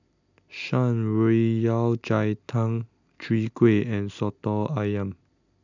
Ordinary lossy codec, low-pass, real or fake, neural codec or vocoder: none; 7.2 kHz; real; none